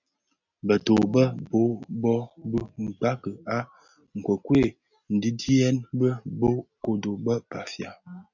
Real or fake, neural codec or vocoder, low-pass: real; none; 7.2 kHz